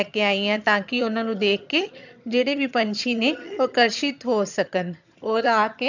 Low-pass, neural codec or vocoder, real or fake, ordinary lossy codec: 7.2 kHz; vocoder, 22.05 kHz, 80 mel bands, HiFi-GAN; fake; none